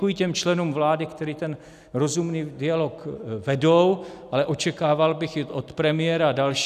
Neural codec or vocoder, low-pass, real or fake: none; 14.4 kHz; real